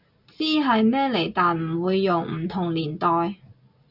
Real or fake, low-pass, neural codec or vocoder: real; 5.4 kHz; none